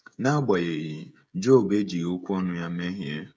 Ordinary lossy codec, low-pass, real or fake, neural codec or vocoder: none; none; fake; codec, 16 kHz, 16 kbps, FreqCodec, smaller model